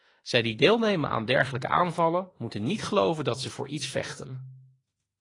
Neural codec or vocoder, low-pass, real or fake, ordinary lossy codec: autoencoder, 48 kHz, 32 numbers a frame, DAC-VAE, trained on Japanese speech; 10.8 kHz; fake; AAC, 32 kbps